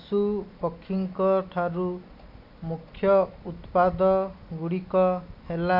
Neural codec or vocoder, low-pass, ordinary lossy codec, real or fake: none; 5.4 kHz; none; real